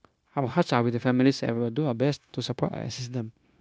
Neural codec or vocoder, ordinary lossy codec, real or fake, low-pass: codec, 16 kHz, 0.9 kbps, LongCat-Audio-Codec; none; fake; none